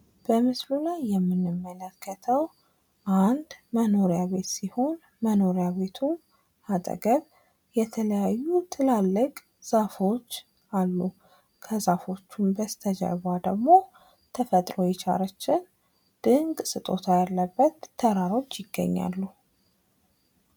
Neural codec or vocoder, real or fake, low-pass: none; real; 19.8 kHz